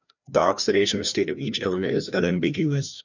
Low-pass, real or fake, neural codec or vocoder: 7.2 kHz; fake; codec, 16 kHz, 1 kbps, FreqCodec, larger model